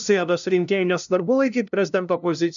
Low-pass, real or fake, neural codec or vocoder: 7.2 kHz; fake; codec, 16 kHz, 0.5 kbps, FunCodec, trained on LibriTTS, 25 frames a second